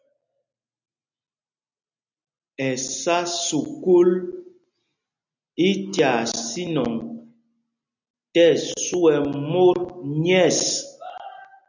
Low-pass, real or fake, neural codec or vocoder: 7.2 kHz; real; none